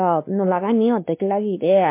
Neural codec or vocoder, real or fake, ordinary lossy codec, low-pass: codec, 16 kHz, 2 kbps, X-Codec, WavLM features, trained on Multilingual LibriSpeech; fake; MP3, 24 kbps; 3.6 kHz